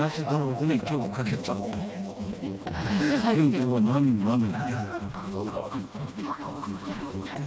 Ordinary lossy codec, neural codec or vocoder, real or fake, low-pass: none; codec, 16 kHz, 1 kbps, FreqCodec, smaller model; fake; none